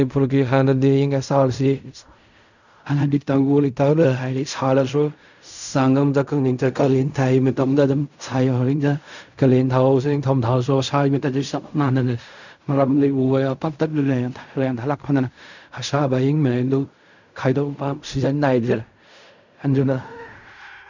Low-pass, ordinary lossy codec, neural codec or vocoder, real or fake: 7.2 kHz; none; codec, 16 kHz in and 24 kHz out, 0.4 kbps, LongCat-Audio-Codec, fine tuned four codebook decoder; fake